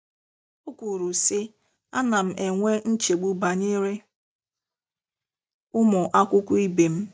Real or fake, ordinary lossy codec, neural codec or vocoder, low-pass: real; none; none; none